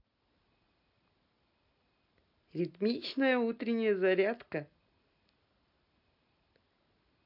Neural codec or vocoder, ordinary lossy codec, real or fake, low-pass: none; none; real; 5.4 kHz